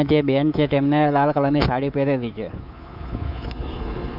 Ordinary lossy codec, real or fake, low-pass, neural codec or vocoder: none; fake; 5.4 kHz; codec, 24 kHz, 3.1 kbps, DualCodec